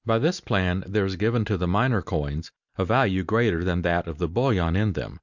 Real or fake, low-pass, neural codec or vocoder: real; 7.2 kHz; none